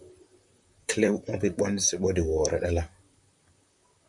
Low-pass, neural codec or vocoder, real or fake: 10.8 kHz; vocoder, 44.1 kHz, 128 mel bands, Pupu-Vocoder; fake